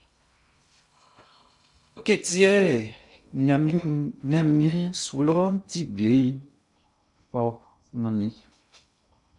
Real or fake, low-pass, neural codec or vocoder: fake; 10.8 kHz; codec, 16 kHz in and 24 kHz out, 0.6 kbps, FocalCodec, streaming, 2048 codes